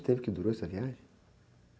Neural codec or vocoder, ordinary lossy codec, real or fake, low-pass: none; none; real; none